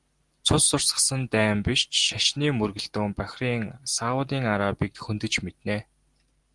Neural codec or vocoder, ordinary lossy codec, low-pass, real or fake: none; Opus, 24 kbps; 10.8 kHz; real